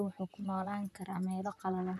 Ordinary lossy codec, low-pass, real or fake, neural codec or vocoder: none; 14.4 kHz; fake; vocoder, 44.1 kHz, 128 mel bands, Pupu-Vocoder